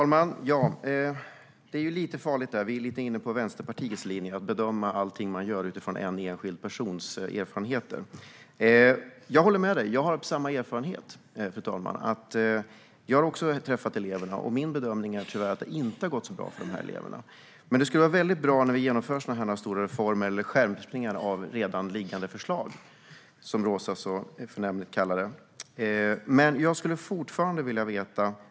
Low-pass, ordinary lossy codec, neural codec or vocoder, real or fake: none; none; none; real